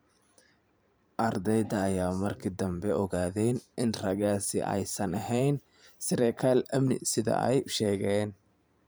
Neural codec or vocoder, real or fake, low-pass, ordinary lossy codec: vocoder, 44.1 kHz, 128 mel bands every 512 samples, BigVGAN v2; fake; none; none